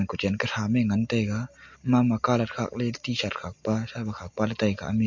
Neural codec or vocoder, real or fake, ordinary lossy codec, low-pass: none; real; MP3, 48 kbps; 7.2 kHz